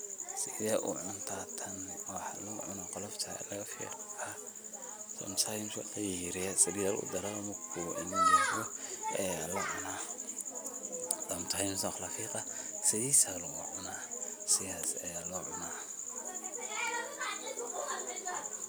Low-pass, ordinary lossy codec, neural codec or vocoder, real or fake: none; none; none; real